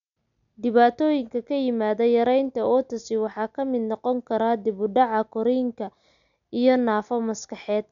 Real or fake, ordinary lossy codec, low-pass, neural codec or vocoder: real; none; 7.2 kHz; none